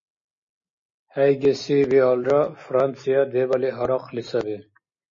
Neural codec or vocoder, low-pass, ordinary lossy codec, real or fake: none; 7.2 kHz; MP3, 32 kbps; real